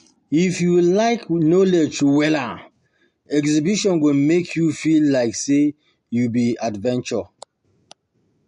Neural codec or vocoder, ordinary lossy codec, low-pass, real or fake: none; MP3, 48 kbps; 14.4 kHz; real